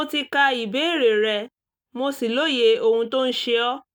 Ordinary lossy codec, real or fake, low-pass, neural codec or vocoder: none; real; 19.8 kHz; none